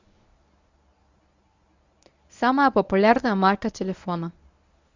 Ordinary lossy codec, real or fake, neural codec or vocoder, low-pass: Opus, 64 kbps; fake; codec, 24 kHz, 0.9 kbps, WavTokenizer, medium speech release version 1; 7.2 kHz